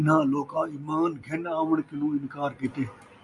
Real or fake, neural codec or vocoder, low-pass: real; none; 10.8 kHz